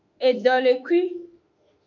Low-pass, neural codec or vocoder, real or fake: 7.2 kHz; autoencoder, 48 kHz, 32 numbers a frame, DAC-VAE, trained on Japanese speech; fake